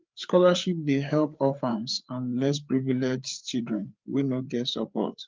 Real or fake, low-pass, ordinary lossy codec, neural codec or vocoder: fake; 7.2 kHz; Opus, 32 kbps; codec, 16 kHz, 4 kbps, FreqCodec, larger model